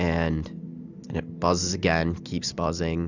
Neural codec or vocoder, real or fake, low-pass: none; real; 7.2 kHz